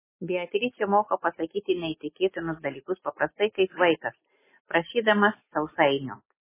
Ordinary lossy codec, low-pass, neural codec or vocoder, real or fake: MP3, 16 kbps; 3.6 kHz; none; real